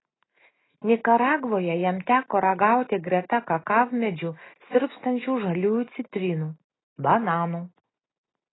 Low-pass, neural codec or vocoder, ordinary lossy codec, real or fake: 7.2 kHz; none; AAC, 16 kbps; real